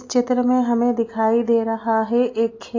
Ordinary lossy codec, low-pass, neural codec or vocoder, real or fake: AAC, 32 kbps; 7.2 kHz; none; real